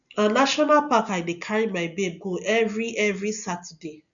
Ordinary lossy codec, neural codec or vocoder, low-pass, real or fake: none; none; 7.2 kHz; real